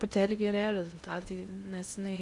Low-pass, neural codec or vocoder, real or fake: 10.8 kHz; codec, 16 kHz in and 24 kHz out, 0.6 kbps, FocalCodec, streaming, 2048 codes; fake